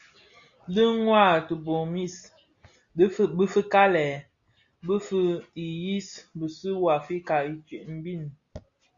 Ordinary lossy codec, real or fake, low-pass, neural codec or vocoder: Opus, 64 kbps; real; 7.2 kHz; none